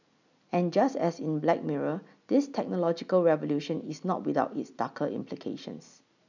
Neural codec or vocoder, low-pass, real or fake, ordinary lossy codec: none; 7.2 kHz; real; none